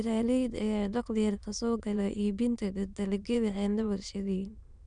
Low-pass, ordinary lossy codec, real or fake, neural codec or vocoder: 9.9 kHz; none; fake; autoencoder, 22.05 kHz, a latent of 192 numbers a frame, VITS, trained on many speakers